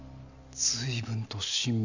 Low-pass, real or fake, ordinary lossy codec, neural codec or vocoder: 7.2 kHz; real; none; none